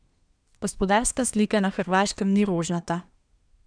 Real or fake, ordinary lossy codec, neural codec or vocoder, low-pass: fake; none; codec, 24 kHz, 1 kbps, SNAC; 9.9 kHz